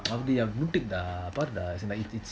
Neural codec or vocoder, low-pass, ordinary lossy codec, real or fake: none; none; none; real